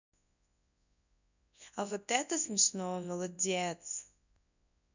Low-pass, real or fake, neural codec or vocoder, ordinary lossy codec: 7.2 kHz; fake; codec, 24 kHz, 0.9 kbps, WavTokenizer, large speech release; none